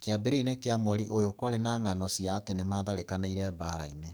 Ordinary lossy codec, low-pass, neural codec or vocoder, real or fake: none; none; codec, 44.1 kHz, 2.6 kbps, SNAC; fake